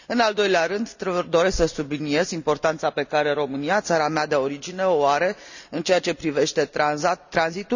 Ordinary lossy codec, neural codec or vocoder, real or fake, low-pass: none; none; real; 7.2 kHz